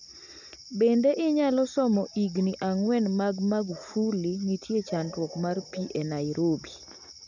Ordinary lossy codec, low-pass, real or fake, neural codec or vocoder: none; 7.2 kHz; real; none